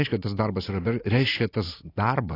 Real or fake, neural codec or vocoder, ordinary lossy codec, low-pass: real; none; AAC, 24 kbps; 5.4 kHz